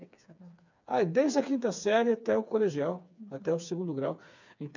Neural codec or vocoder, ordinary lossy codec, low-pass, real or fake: codec, 16 kHz, 4 kbps, FreqCodec, smaller model; none; 7.2 kHz; fake